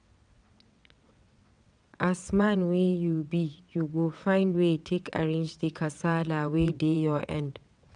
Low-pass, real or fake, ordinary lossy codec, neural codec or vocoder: 9.9 kHz; fake; none; vocoder, 22.05 kHz, 80 mel bands, WaveNeXt